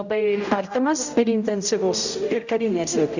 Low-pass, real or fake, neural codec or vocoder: 7.2 kHz; fake; codec, 16 kHz, 0.5 kbps, X-Codec, HuBERT features, trained on general audio